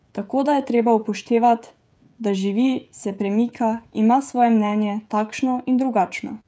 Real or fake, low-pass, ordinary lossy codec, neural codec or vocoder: fake; none; none; codec, 16 kHz, 16 kbps, FreqCodec, smaller model